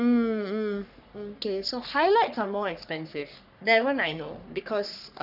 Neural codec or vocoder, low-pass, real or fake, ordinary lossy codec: codec, 44.1 kHz, 3.4 kbps, Pupu-Codec; 5.4 kHz; fake; none